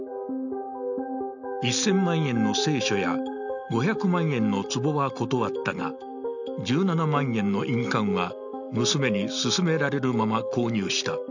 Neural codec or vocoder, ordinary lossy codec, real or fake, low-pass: none; none; real; 7.2 kHz